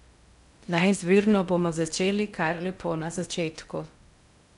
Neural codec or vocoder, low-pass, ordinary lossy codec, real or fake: codec, 16 kHz in and 24 kHz out, 0.6 kbps, FocalCodec, streaming, 2048 codes; 10.8 kHz; none; fake